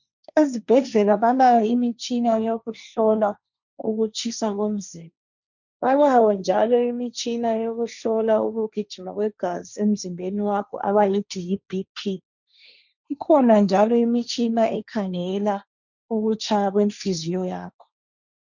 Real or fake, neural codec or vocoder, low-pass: fake; codec, 16 kHz, 1.1 kbps, Voila-Tokenizer; 7.2 kHz